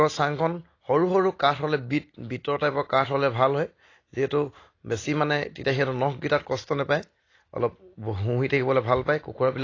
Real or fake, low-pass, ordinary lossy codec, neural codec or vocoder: real; 7.2 kHz; AAC, 32 kbps; none